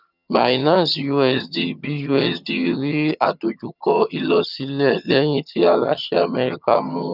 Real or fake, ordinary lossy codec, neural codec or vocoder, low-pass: fake; none; vocoder, 22.05 kHz, 80 mel bands, HiFi-GAN; 5.4 kHz